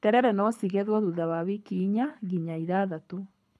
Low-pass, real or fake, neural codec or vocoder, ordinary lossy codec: none; fake; codec, 24 kHz, 6 kbps, HILCodec; none